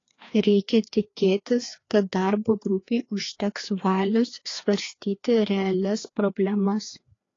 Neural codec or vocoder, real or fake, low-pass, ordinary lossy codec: codec, 16 kHz, 2 kbps, FreqCodec, larger model; fake; 7.2 kHz; AAC, 32 kbps